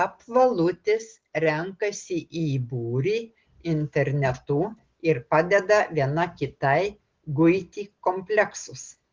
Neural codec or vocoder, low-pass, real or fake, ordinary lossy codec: none; 7.2 kHz; real; Opus, 16 kbps